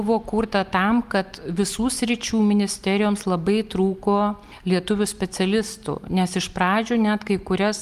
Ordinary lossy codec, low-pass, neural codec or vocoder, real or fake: Opus, 32 kbps; 14.4 kHz; none; real